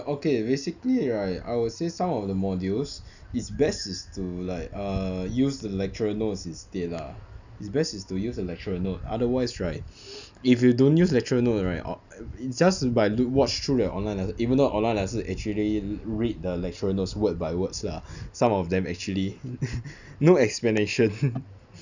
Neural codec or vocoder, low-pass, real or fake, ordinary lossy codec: none; 7.2 kHz; real; none